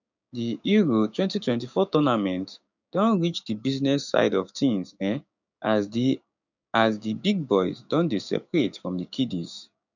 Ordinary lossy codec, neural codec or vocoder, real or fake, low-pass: none; codec, 16 kHz, 6 kbps, DAC; fake; 7.2 kHz